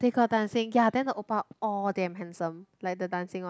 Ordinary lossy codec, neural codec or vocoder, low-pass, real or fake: none; none; none; real